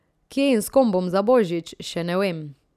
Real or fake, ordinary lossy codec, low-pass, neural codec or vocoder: real; none; 14.4 kHz; none